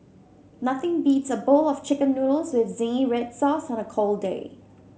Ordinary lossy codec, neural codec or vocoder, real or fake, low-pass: none; none; real; none